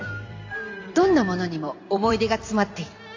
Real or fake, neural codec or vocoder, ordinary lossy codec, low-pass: fake; vocoder, 44.1 kHz, 128 mel bands every 256 samples, BigVGAN v2; AAC, 48 kbps; 7.2 kHz